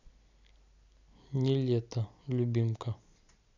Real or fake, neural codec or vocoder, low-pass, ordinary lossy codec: real; none; 7.2 kHz; none